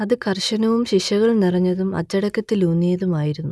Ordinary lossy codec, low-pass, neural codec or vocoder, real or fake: none; none; none; real